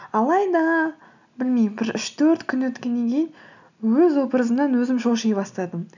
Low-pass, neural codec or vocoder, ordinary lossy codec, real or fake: 7.2 kHz; none; none; real